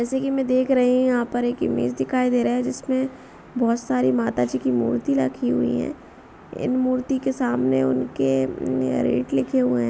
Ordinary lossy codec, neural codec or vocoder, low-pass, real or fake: none; none; none; real